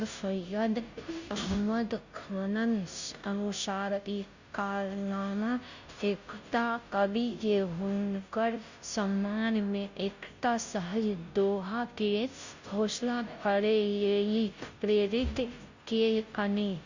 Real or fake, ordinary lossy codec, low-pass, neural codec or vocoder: fake; Opus, 64 kbps; 7.2 kHz; codec, 16 kHz, 0.5 kbps, FunCodec, trained on Chinese and English, 25 frames a second